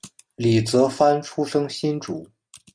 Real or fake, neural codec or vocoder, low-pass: real; none; 9.9 kHz